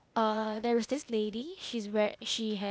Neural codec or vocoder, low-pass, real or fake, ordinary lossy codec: codec, 16 kHz, 0.8 kbps, ZipCodec; none; fake; none